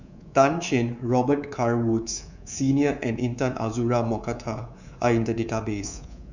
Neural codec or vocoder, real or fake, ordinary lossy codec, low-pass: codec, 24 kHz, 3.1 kbps, DualCodec; fake; none; 7.2 kHz